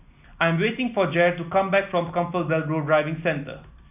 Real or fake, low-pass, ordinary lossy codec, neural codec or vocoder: real; 3.6 kHz; none; none